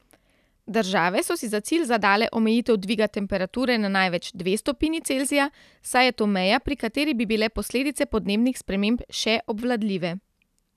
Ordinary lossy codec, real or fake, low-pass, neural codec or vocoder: none; real; 14.4 kHz; none